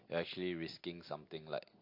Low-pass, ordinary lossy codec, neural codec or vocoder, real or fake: 5.4 kHz; MP3, 32 kbps; none; real